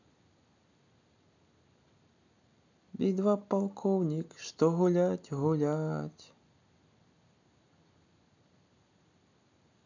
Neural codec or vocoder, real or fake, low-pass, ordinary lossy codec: none; real; 7.2 kHz; none